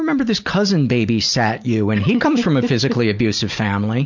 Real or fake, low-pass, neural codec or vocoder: real; 7.2 kHz; none